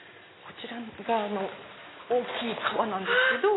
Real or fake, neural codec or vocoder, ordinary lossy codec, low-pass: real; none; AAC, 16 kbps; 7.2 kHz